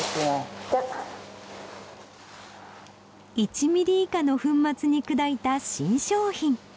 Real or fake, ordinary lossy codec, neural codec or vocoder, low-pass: real; none; none; none